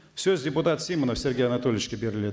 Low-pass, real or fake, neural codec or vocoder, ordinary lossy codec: none; real; none; none